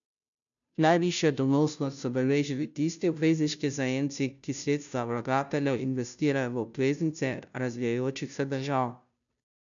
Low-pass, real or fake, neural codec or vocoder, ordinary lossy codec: 7.2 kHz; fake; codec, 16 kHz, 0.5 kbps, FunCodec, trained on Chinese and English, 25 frames a second; none